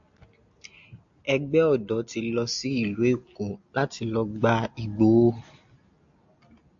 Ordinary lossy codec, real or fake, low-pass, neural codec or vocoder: AAC, 64 kbps; real; 7.2 kHz; none